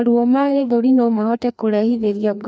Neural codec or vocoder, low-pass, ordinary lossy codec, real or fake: codec, 16 kHz, 2 kbps, FreqCodec, larger model; none; none; fake